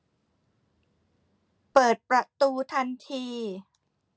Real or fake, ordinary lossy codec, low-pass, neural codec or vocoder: real; none; none; none